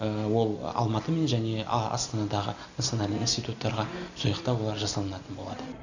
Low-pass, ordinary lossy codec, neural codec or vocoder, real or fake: 7.2 kHz; none; none; real